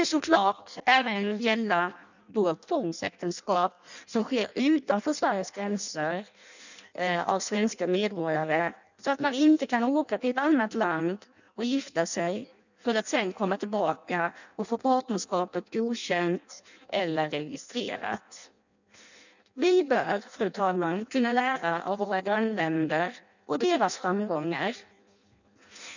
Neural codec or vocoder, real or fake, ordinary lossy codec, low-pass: codec, 16 kHz in and 24 kHz out, 0.6 kbps, FireRedTTS-2 codec; fake; none; 7.2 kHz